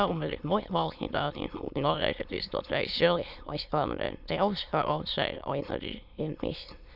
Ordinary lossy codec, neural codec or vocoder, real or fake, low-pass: none; autoencoder, 22.05 kHz, a latent of 192 numbers a frame, VITS, trained on many speakers; fake; 5.4 kHz